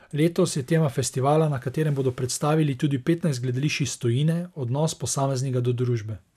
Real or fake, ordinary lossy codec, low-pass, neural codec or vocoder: real; none; 14.4 kHz; none